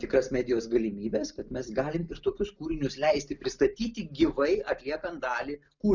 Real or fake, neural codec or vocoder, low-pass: real; none; 7.2 kHz